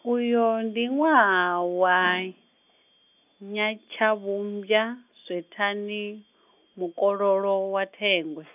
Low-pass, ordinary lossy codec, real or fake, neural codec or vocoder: 3.6 kHz; none; real; none